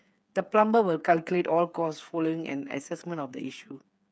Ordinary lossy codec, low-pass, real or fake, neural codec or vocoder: none; none; fake; codec, 16 kHz, 8 kbps, FreqCodec, smaller model